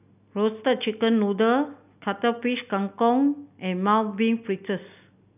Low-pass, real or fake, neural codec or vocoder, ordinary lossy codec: 3.6 kHz; real; none; none